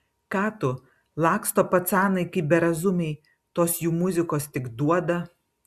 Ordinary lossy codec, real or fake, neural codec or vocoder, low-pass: Opus, 64 kbps; real; none; 14.4 kHz